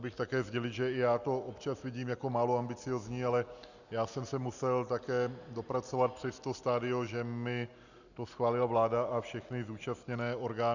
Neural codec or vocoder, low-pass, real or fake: none; 7.2 kHz; real